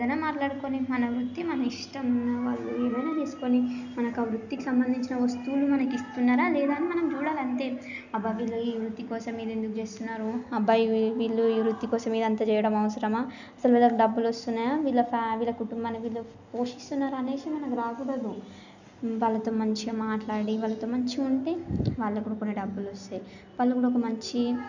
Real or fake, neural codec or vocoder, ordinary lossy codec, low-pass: real; none; none; 7.2 kHz